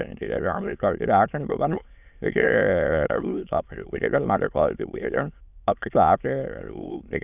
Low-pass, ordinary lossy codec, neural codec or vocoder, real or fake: 3.6 kHz; none; autoencoder, 22.05 kHz, a latent of 192 numbers a frame, VITS, trained on many speakers; fake